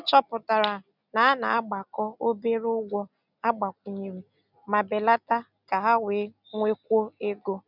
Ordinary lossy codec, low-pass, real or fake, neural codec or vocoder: none; 5.4 kHz; real; none